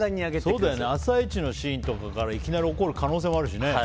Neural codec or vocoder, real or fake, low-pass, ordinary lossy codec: none; real; none; none